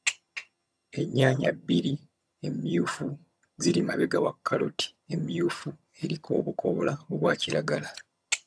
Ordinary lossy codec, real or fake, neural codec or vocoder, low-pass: none; fake; vocoder, 22.05 kHz, 80 mel bands, HiFi-GAN; none